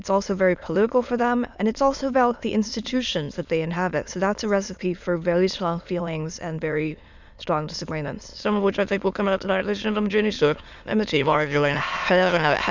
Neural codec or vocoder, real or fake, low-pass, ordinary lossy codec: autoencoder, 22.05 kHz, a latent of 192 numbers a frame, VITS, trained on many speakers; fake; 7.2 kHz; Opus, 64 kbps